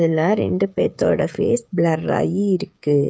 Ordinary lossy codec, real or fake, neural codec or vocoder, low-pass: none; fake; codec, 16 kHz, 8 kbps, FreqCodec, smaller model; none